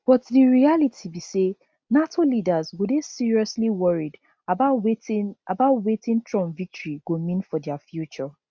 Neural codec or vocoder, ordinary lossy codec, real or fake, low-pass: none; none; real; none